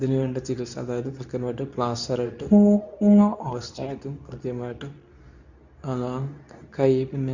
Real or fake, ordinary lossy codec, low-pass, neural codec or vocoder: fake; none; 7.2 kHz; codec, 24 kHz, 0.9 kbps, WavTokenizer, medium speech release version 2